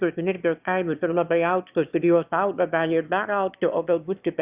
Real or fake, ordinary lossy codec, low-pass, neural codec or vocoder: fake; Opus, 64 kbps; 3.6 kHz; autoencoder, 22.05 kHz, a latent of 192 numbers a frame, VITS, trained on one speaker